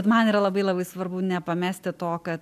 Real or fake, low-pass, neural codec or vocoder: real; 14.4 kHz; none